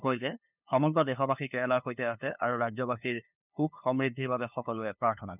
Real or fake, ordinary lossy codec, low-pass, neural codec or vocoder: fake; none; 3.6 kHz; codec, 16 kHz, 2 kbps, FunCodec, trained on LibriTTS, 25 frames a second